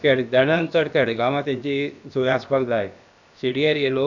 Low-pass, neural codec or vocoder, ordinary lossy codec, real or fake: 7.2 kHz; codec, 16 kHz, about 1 kbps, DyCAST, with the encoder's durations; none; fake